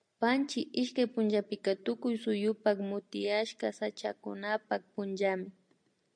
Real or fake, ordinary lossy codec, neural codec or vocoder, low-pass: real; MP3, 64 kbps; none; 9.9 kHz